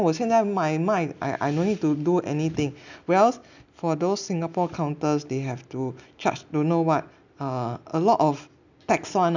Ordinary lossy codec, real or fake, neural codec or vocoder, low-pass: none; real; none; 7.2 kHz